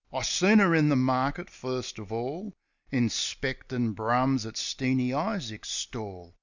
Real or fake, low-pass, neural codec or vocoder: real; 7.2 kHz; none